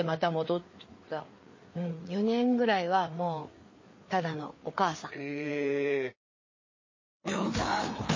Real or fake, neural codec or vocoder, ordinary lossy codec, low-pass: fake; codec, 16 kHz, 4 kbps, FreqCodec, larger model; MP3, 32 kbps; 7.2 kHz